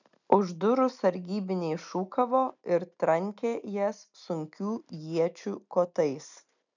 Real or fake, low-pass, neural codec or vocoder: real; 7.2 kHz; none